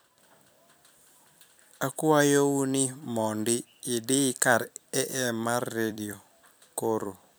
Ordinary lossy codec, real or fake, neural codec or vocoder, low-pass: none; fake; vocoder, 44.1 kHz, 128 mel bands every 256 samples, BigVGAN v2; none